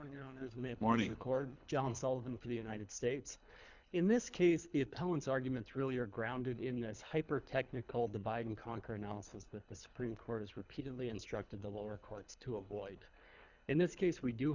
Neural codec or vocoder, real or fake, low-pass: codec, 24 kHz, 3 kbps, HILCodec; fake; 7.2 kHz